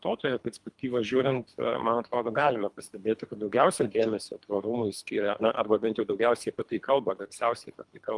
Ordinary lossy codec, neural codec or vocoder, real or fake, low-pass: Opus, 32 kbps; codec, 24 kHz, 3 kbps, HILCodec; fake; 10.8 kHz